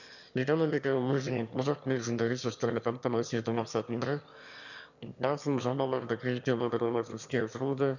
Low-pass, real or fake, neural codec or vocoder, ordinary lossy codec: 7.2 kHz; fake; autoencoder, 22.05 kHz, a latent of 192 numbers a frame, VITS, trained on one speaker; none